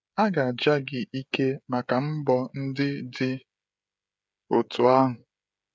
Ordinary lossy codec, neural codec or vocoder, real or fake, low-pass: none; codec, 16 kHz, 16 kbps, FreqCodec, smaller model; fake; none